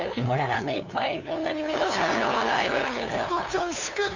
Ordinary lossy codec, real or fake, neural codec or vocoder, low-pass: none; fake; codec, 16 kHz, 2 kbps, FunCodec, trained on LibriTTS, 25 frames a second; 7.2 kHz